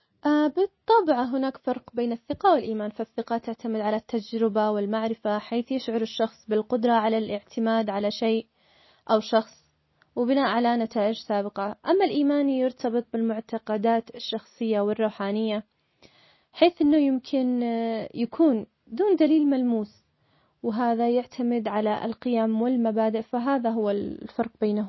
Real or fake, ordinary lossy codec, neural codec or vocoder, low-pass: real; MP3, 24 kbps; none; 7.2 kHz